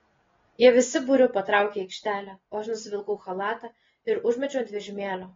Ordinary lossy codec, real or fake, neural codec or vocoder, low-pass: AAC, 24 kbps; real; none; 7.2 kHz